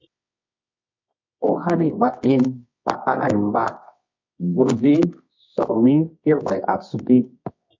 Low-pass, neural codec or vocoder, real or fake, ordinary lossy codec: 7.2 kHz; codec, 24 kHz, 0.9 kbps, WavTokenizer, medium music audio release; fake; MP3, 48 kbps